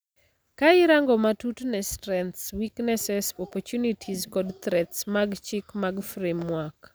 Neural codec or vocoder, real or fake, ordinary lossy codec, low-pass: none; real; none; none